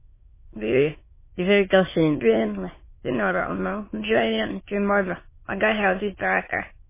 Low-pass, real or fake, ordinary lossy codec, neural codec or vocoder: 3.6 kHz; fake; MP3, 16 kbps; autoencoder, 22.05 kHz, a latent of 192 numbers a frame, VITS, trained on many speakers